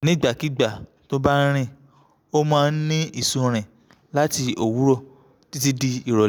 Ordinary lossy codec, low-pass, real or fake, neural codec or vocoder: none; none; real; none